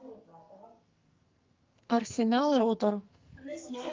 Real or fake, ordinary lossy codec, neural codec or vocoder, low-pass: fake; Opus, 24 kbps; codec, 44.1 kHz, 2.6 kbps, SNAC; 7.2 kHz